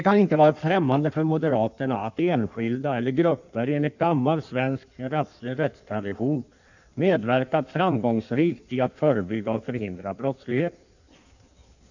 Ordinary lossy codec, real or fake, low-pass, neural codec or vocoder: none; fake; 7.2 kHz; codec, 16 kHz in and 24 kHz out, 1.1 kbps, FireRedTTS-2 codec